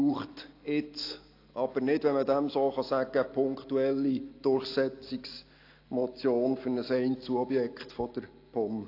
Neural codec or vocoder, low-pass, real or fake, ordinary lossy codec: none; 5.4 kHz; real; AAC, 32 kbps